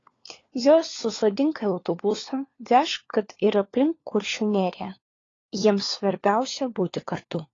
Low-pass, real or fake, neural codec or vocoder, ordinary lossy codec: 7.2 kHz; fake; codec, 16 kHz, 4 kbps, FunCodec, trained on LibriTTS, 50 frames a second; AAC, 32 kbps